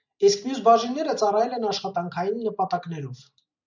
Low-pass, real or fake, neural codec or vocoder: 7.2 kHz; real; none